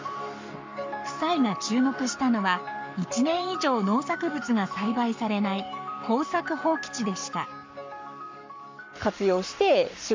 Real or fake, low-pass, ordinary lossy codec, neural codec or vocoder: fake; 7.2 kHz; MP3, 64 kbps; codec, 44.1 kHz, 7.8 kbps, Pupu-Codec